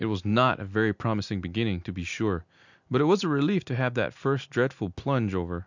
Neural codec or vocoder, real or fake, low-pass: none; real; 7.2 kHz